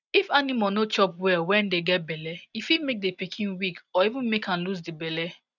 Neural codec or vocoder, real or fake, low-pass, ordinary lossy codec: none; real; 7.2 kHz; none